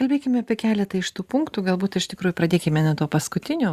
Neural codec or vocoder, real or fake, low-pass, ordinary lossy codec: vocoder, 44.1 kHz, 128 mel bands every 512 samples, BigVGAN v2; fake; 14.4 kHz; Opus, 64 kbps